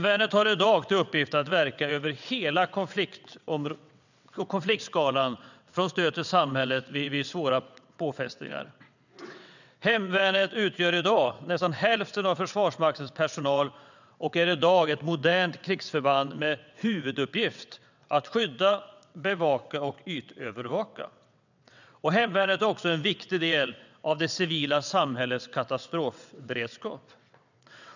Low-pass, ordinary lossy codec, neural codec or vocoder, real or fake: 7.2 kHz; none; vocoder, 22.05 kHz, 80 mel bands, WaveNeXt; fake